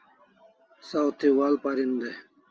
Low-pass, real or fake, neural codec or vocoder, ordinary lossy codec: 7.2 kHz; real; none; Opus, 24 kbps